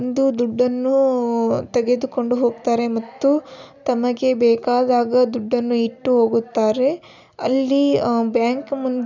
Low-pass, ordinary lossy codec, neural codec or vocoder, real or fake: 7.2 kHz; none; none; real